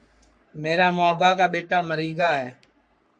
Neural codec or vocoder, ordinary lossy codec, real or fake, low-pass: codec, 44.1 kHz, 3.4 kbps, Pupu-Codec; MP3, 64 kbps; fake; 9.9 kHz